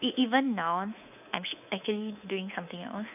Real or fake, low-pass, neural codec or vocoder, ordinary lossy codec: fake; 3.6 kHz; codec, 24 kHz, 3.1 kbps, DualCodec; none